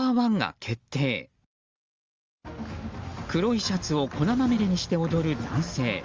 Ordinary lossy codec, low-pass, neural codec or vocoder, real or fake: Opus, 32 kbps; 7.2 kHz; none; real